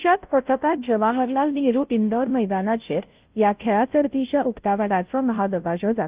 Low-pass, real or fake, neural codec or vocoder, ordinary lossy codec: 3.6 kHz; fake; codec, 16 kHz, 0.5 kbps, FunCodec, trained on Chinese and English, 25 frames a second; Opus, 32 kbps